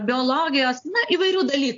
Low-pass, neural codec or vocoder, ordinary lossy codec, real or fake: 7.2 kHz; none; AAC, 64 kbps; real